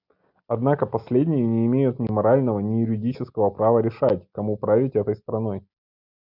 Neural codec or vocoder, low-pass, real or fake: none; 5.4 kHz; real